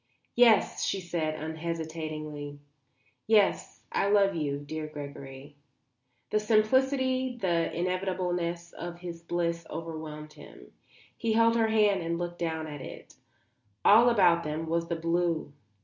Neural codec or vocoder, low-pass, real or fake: none; 7.2 kHz; real